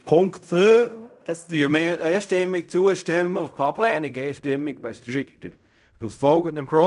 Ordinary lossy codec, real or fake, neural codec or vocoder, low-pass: none; fake; codec, 16 kHz in and 24 kHz out, 0.4 kbps, LongCat-Audio-Codec, fine tuned four codebook decoder; 10.8 kHz